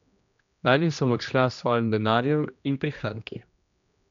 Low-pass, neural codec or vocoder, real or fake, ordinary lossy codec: 7.2 kHz; codec, 16 kHz, 1 kbps, X-Codec, HuBERT features, trained on general audio; fake; none